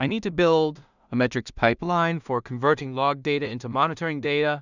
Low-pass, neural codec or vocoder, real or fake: 7.2 kHz; codec, 16 kHz in and 24 kHz out, 0.4 kbps, LongCat-Audio-Codec, two codebook decoder; fake